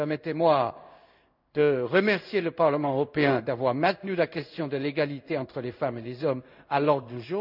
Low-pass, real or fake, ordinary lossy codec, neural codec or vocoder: 5.4 kHz; fake; none; codec, 16 kHz in and 24 kHz out, 1 kbps, XY-Tokenizer